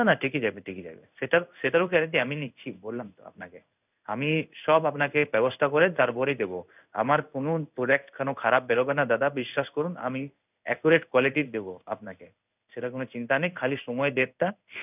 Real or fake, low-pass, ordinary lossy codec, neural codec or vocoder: fake; 3.6 kHz; none; codec, 16 kHz in and 24 kHz out, 1 kbps, XY-Tokenizer